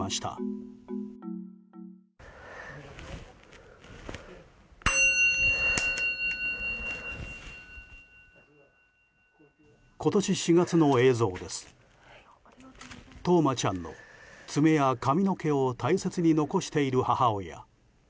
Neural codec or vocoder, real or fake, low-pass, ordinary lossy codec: none; real; none; none